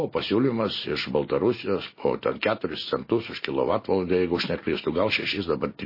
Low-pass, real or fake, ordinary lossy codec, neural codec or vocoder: 5.4 kHz; real; MP3, 24 kbps; none